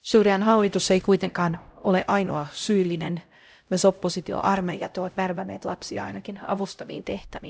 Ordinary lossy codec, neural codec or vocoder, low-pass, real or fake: none; codec, 16 kHz, 0.5 kbps, X-Codec, HuBERT features, trained on LibriSpeech; none; fake